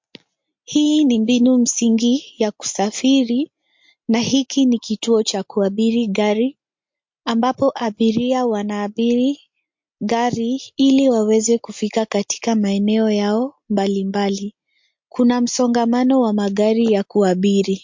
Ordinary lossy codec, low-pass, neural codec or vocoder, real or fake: MP3, 48 kbps; 7.2 kHz; none; real